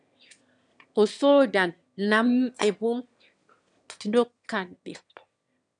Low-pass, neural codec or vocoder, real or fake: 9.9 kHz; autoencoder, 22.05 kHz, a latent of 192 numbers a frame, VITS, trained on one speaker; fake